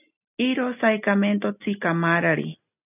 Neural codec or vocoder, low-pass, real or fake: none; 3.6 kHz; real